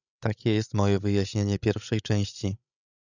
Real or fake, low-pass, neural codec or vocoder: fake; 7.2 kHz; codec, 16 kHz, 16 kbps, FreqCodec, larger model